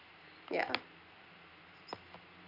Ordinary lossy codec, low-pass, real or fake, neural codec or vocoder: none; 5.4 kHz; real; none